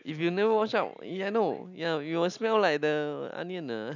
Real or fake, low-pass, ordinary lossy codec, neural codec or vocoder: real; 7.2 kHz; none; none